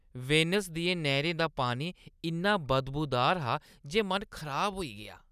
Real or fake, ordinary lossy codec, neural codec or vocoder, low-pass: real; none; none; 14.4 kHz